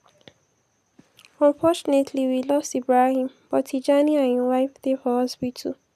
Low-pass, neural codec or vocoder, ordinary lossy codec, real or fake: 14.4 kHz; none; none; real